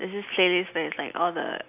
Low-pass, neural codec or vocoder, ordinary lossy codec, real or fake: 3.6 kHz; none; none; real